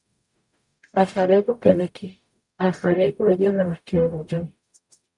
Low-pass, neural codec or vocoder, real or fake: 10.8 kHz; codec, 44.1 kHz, 0.9 kbps, DAC; fake